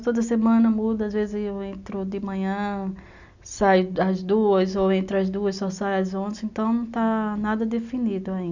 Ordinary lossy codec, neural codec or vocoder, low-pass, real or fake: none; none; 7.2 kHz; real